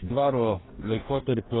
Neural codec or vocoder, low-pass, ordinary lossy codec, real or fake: codec, 44.1 kHz, 2.6 kbps, DAC; 7.2 kHz; AAC, 16 kbps; fake